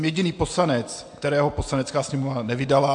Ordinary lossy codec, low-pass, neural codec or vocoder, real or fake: AAC, 64 kbps; 9.9 kHz; none; real